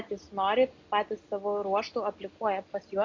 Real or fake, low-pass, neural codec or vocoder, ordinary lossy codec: real; 7.2 kHz; none; MP3, 64 kbps